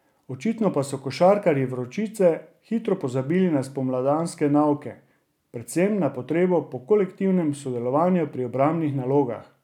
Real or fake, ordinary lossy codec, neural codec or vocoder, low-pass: real; none; none; 19.8 kHz